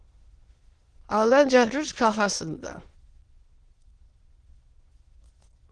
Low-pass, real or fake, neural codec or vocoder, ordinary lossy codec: 9.9 kHz; fake; autoencoder, 22.05 kHz, a latent of 192 numbers a frame, VITS, trained on many speakers; Opus, 16 kbps